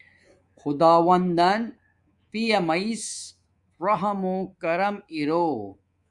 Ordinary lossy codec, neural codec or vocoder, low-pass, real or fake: Opus, 64 kbps; codec, 24 kHz, 3.1 kbps, DualCodec; 10.8 kHz; fake